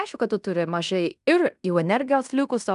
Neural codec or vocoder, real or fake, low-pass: codec, 16 kHz in and 24 kHz out, 0.9 kbps, LongCat-Audio-Codec, fine tuned four codebook decoder; fake; 10.8 kHz